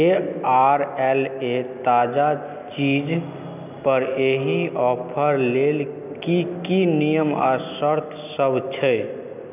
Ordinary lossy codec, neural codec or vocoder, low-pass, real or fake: none; none; 3.6 kHz; real